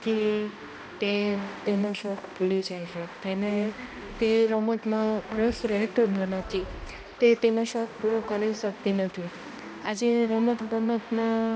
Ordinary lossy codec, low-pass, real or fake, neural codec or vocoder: none; none; fake; codec, 16 kHz, 1 kbps, X-Codec, HuBERT features, trained on balanced general audio